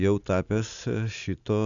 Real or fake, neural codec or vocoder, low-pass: real; none; 7.2 kHz